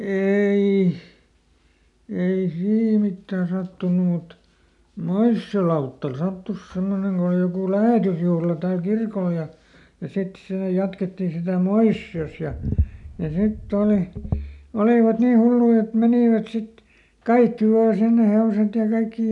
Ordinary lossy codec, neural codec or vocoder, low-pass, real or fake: none; none; 10.8 kHz; real